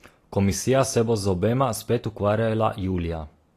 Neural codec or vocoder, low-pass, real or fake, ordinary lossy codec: none; 14.4 kHz; real; AAC, 48 kbps